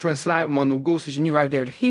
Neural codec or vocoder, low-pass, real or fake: codec, 16 kHz in and 24 kHz out, 0.4 kbps, LongCat-Audio-Codec, fine tuned four codebook decoder; 10.8 kHz; fake